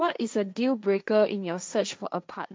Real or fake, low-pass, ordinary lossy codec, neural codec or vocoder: fake; none; none; codec, 16 kHz, 1.1 kbps, Voila-Tokenizer